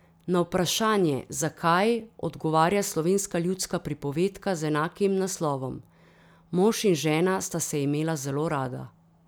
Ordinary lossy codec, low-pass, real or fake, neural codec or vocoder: none; none; real; none